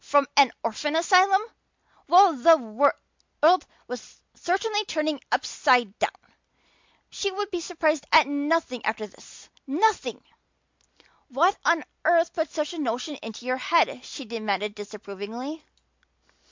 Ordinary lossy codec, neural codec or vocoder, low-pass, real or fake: MP3, 64 kbps; none; 7.2 kHz; real